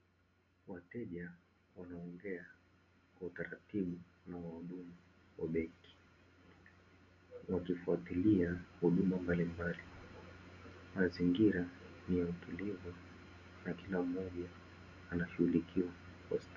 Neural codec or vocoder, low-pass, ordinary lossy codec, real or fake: none; 7.2 kHz; AAC, 48 kbps; real